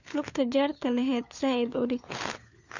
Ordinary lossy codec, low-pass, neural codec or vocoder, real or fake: none; 7.2 kHz; codec, 16 kHz, 4 kbps, FunCodec, trained on LibriTTS, 50 frames a second; fake